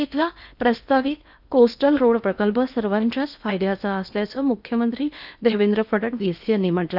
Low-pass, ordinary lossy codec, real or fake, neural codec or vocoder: 5.4 kHz; none; fake; codec, 16 kHz in and 24 kHz out, 0.8 kbps, FocalCodec, streaming, 65536 codes